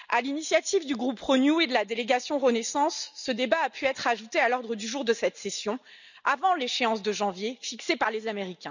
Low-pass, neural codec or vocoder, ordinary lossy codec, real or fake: 7.2 kHz; none; none; real